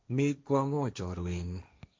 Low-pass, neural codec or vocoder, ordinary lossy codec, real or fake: none; codec, 16 kHz, 1.1 kbps, Voila-Tokenizer; none; fake